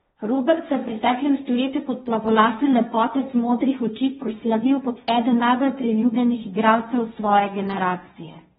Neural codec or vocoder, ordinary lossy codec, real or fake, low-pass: codec, 16 kHz, 1.1 kbps, Voila-Tokenizer; AAC, 16 kbps; fake; 7.2 kHz